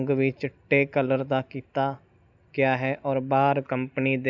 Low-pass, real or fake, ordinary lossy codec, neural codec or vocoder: 7.2 kHz; real; none; none